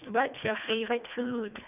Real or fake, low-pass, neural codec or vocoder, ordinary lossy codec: fake; 3.6 kHz; codec, 24 kHz, 1.5 kbps, HILCodec; none